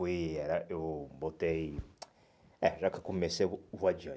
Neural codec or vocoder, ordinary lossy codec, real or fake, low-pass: none; none; real; none